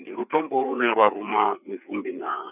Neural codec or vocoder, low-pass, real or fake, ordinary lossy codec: codec, 16 kHz, 4 kbps, FreqCodec, larger model; 3.6 kHz; fake; none